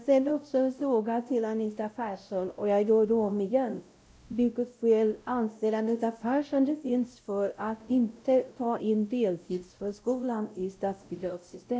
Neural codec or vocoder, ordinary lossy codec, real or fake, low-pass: codec, 16 kHz, 0.5 kbps, X-Codec, WavLM features, trained on Multilingual LibriSpeech; none; fake; none